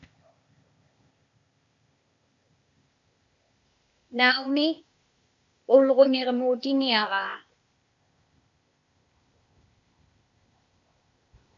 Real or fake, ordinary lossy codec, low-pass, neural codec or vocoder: fake; AAC, 64 kbps; 7.2 kHz; codec, 16 kHz, 0.8 kbps, ZipCodec